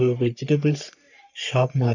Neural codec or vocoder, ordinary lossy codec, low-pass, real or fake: codec, 44.1 kHz, 3.4 kbps, Pupu-Codec; none; 7.2 kHz; fake